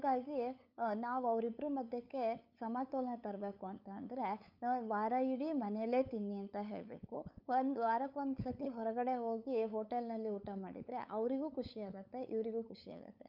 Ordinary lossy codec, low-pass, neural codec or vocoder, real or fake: none; 5.4 kHz; codec, 16 kHz, 8 kbps, FunCodec, trained on LibriTTS, 25 frames a second; fake